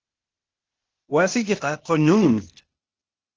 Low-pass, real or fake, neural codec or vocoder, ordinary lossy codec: 7.2 kHz; fake; codec, 16 kHz, 0.8 kbps, ZipCodec; Opus, 16 kbps